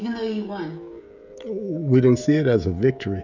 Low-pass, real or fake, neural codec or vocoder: 7.2 kHz; fake; codec, 16 kHz, 16 kbps, FreqCodec, smaller model